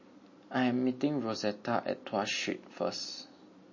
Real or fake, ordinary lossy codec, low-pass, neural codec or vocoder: real; MP3, 32 kbps; 7.2 kHz; none